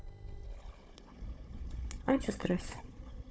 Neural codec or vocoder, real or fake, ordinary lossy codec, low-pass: codec, 16 kHz, 4 kbps, FunCodec, trained on Chinese and English, 50 frames a second; fake; none; none